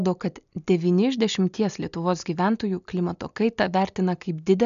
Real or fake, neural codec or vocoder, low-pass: real; none; 7.2 kHz